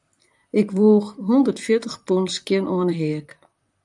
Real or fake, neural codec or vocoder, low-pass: fake; vocoder, 44.1 kHz, 128 mel bands, Pupu-Vocoder; 10.8 kHz